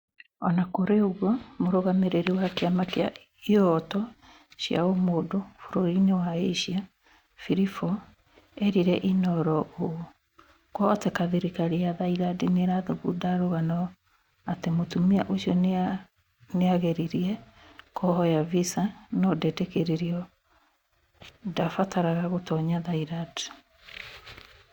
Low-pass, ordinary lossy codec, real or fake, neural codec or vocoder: 19.8 kHz; Opus, 64 kbps; real; none